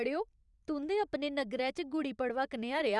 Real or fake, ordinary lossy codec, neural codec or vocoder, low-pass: fake; none; vocoder, 44.1 kHz, 128 mel bands every 512 samples, BigVGAN v2; 10.8 kHz